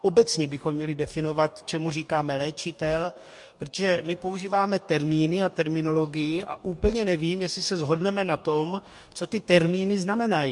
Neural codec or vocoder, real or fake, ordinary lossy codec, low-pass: codec, 44.1 kHz, 2.6 kbps, DAC; fake; MP3, 64 kbps; 10.8 kHz